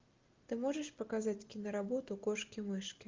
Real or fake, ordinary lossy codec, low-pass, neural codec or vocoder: real; Opus, 24 kbps; 7.2 kHz; none